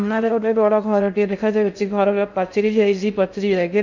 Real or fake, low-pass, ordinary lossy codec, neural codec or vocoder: fake; 7.2 kHz; none; codec, 16 kHz in and 24 kHz out, 0.6 kbps, FocalCodec, streaming, 2048 codes